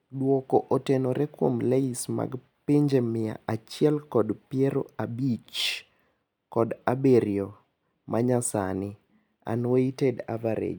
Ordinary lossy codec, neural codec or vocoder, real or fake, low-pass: none; none; real; none